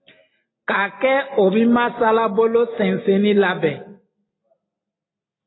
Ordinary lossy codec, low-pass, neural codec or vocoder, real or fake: AAC, 16 kbps; 7.2 kHz; none; real